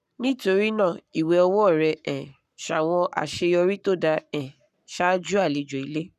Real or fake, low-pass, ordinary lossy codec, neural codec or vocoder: fake; 14.4 kHz; none; codec, 44.1 kHz, 7.8 kbps, Pupu-Codec